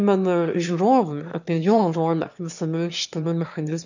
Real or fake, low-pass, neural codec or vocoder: fake; 7.2 kHz; autoencoder, 22.05 kHz, a latent of 192 numbers a frame, VITS, trained on one speaker